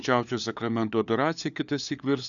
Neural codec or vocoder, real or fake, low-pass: codec, 16 kHz, 4 kbps, FunCodec, trained on LibriTTS, 50 frames a second; fake; 7.2 kHz